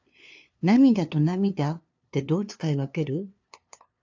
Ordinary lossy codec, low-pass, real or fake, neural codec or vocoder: AAC, 48 kbps; 7.2 kHz; fake; codec, 16 kHz, 2 kbps, FunCodec, trained on LibriTTS, 25 frames a second